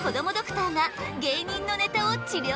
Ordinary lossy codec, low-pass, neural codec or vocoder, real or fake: none; none; none; real